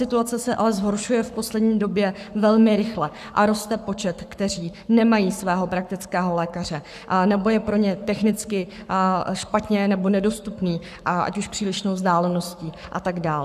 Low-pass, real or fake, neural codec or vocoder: 14.4 kHz; fake; codec, 44.1 kHz, 7.8 kbps, Pupu-Codec